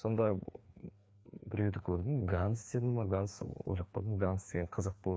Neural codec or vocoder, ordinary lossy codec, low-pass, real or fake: codec, 16 kHz, 2 kbps, FreqCodec, larger model; none; none; fake